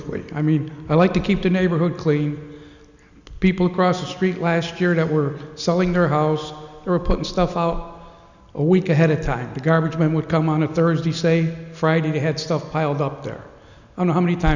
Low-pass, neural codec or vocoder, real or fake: 7.2 kHz; none; real